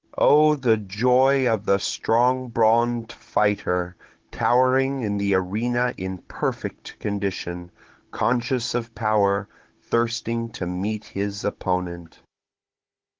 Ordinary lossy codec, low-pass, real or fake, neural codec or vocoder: Opus, 16 kbps; 7.2 kHz; fake; codec, 16 kHz, 16 kbps, FunCodec, trained on Chinese and English, 50 frames a second